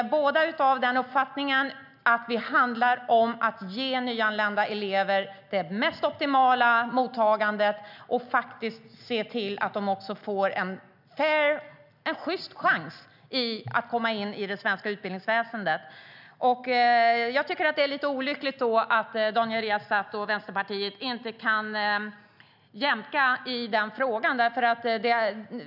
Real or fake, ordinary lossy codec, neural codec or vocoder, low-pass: real; none; none; 5.4 kHz